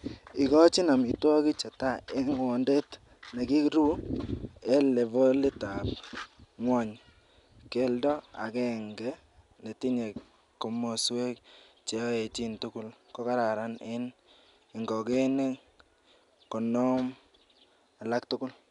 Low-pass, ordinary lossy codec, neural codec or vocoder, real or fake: 10.8 kHz; none; none; real